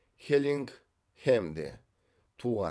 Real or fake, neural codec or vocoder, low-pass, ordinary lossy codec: fake; vocoder, 22.05 kHz, 80 mel bands, WaveNeXt; none; none